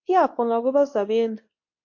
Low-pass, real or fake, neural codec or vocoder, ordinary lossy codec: 7.2 kHz; fake; codec, 24 kHz, 0.9 kbps, WavTokenizer, medium speech release version 2; MP3, 48 kbps